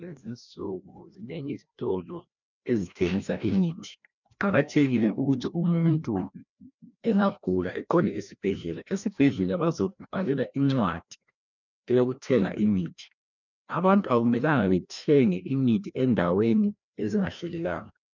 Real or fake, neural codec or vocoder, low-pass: fake; codec, 16 kHz, 1 kbps, FreqCodec, larger model; 7.2 kHz